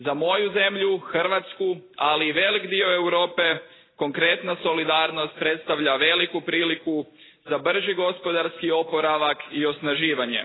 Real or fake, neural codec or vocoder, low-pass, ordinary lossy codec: real; none; 7.2 kHz; AAC, 16 kbps